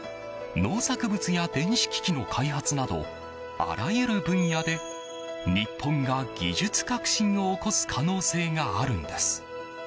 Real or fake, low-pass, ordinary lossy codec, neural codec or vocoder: real; none; none; none